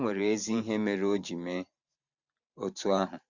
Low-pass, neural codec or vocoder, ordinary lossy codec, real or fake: 7.2 kHz; vocoder, 44.1 kHz, 128 mel bands every 512 samples, BigVGAN v2; Opus, 64 kbps; fake